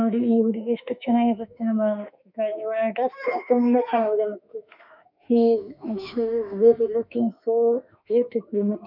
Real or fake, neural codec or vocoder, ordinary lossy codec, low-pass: fake; autoencoder, 48 kHz, 32 numbers a frame, DAC-VAE, trained on Japanese speech; none; 5.4 kHz